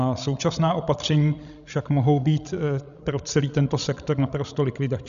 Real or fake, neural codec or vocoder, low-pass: fake; codec, 16 kHz, 16 kbps, FreqCodec, larger model; 7.2 kHz